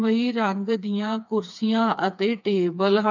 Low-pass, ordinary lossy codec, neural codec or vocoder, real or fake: 7.2 kHz; none; codec, 16 kHz, 4 kbps, FreqCodec, smaller model; fake